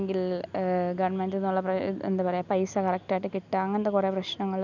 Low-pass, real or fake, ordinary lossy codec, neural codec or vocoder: 7.2 kHz; real; none; none